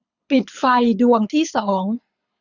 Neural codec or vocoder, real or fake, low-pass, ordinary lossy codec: codec, 24 kHz, 6 kbps, HILCodec; fake; 7.2 kHz; none